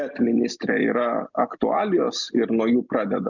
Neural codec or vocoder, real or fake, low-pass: none; real; 7.2 kHz